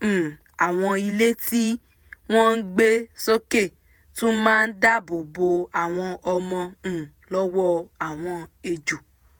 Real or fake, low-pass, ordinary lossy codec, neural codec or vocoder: fake; none; none; vocoder, 48 kHz, 128 mel bands, Vocos